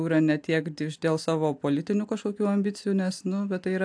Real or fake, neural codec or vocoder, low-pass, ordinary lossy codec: real; none; 9.9 kHz; AAC, 64 kbps